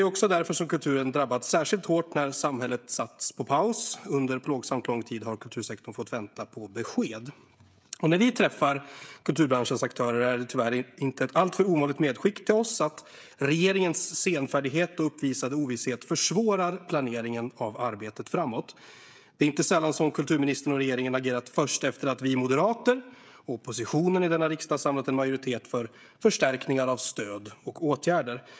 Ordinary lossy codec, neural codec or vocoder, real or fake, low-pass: none; codec, 16 kHz, 16 kbps, FreqCodec, smaller model; fake; none